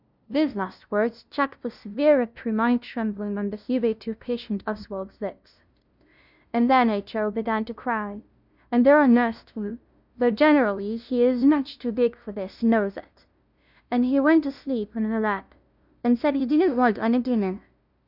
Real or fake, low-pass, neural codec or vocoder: fake; 5.4 kHz; codec, 16 kHz, 0.5 kbps, FunCodec, trained on LibriTTS, 25 frames a second